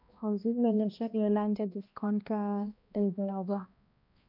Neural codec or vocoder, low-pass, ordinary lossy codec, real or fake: codec, 16 kHz, 1 kbps, X-Codec, HuBERT features, trained on balanced general audio; 5.4 kHz; none; fake